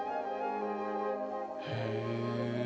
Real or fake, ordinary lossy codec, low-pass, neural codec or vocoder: real; none; none; none